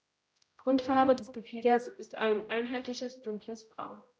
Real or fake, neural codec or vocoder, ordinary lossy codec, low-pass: fake; codec, 16 kHz, 0.5 kbps, X-Codec, HuBERT features, trained on general audio; none; none